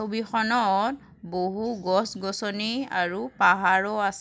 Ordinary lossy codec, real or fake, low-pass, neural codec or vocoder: none; real; none; none